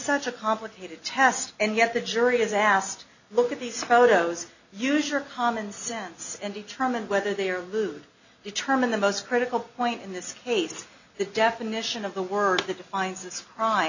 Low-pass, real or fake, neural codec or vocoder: 7.2 kHz; real; none